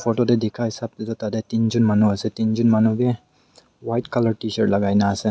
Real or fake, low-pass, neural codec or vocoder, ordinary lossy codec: real; none; none; none